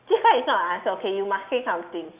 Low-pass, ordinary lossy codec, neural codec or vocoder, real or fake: 3.6 kHz; Opus, 64 kbps; none; real